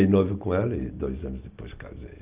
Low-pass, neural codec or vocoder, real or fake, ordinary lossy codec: 3.6 kHz; none; real; Opus, 24 kbps